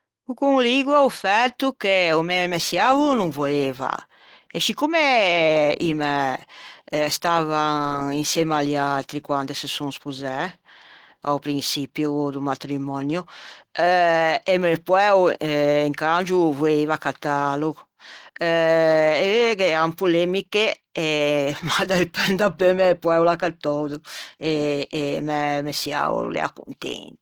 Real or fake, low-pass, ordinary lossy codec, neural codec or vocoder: fake; 19.8 kHz; Opus, 16 kbps; autoencoder, 48 kHz, 128 numbers a frame, DAC-VAE, trained on Japanese speech